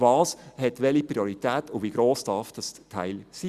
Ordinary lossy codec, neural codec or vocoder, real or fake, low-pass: Opus, 64 kbps; none; real; 14.4 kHz